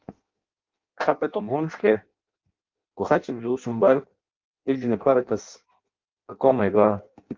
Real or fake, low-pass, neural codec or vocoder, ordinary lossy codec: fake; 7.2 kHz; codec, 16 kHz in and 24 kHz out, 0.6 kbps, FireRedTTS-2 codec; Opus, 24 kbps